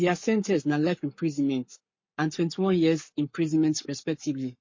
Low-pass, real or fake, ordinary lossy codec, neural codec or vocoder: 7.2 kHz; fake; MP3, 32 kbps; codec, 44.1 kHz, 7.8 kbps, Pupu-Codec